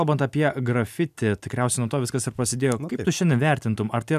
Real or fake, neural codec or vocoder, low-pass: real; none; 14.4 kHz